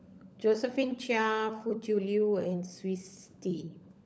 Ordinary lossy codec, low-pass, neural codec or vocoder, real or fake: none; none; codec, 16 kHz, 16 kbps, FunCodec, trained on LibriTTS, 50 frames a second; fake